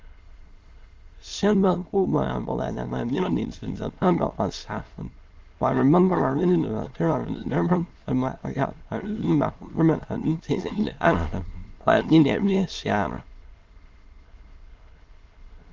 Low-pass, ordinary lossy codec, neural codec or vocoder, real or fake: 7.2 kHz; Opus, 32 kbps; autoencoder, 22.05 kHz, a latent of 192 numbers a frame, VITS, trained on many speakers; fake